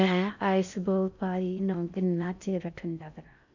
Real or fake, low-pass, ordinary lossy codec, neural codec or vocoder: fake; 7.2 kHz; none; codec, 16 kHz in and 24 kHz out, 0.6 kbps, FocalCodec, streaming, 4096 codes